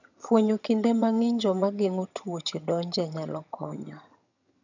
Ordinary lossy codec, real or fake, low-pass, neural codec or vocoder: none; fake; 7.2 kHz; vocoder, 22.05 kHz, 80 mel bands, HiFi-GAN